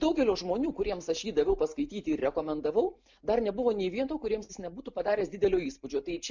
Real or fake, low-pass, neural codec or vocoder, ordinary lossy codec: real; 7.2 kHz; none; MP3, 64 kbps